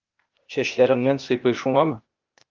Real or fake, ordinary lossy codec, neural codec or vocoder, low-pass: fake; Opus, 24 kbps; codec, 16 kHz, 0.8 kbps, ZipCodec; 7.2 kHz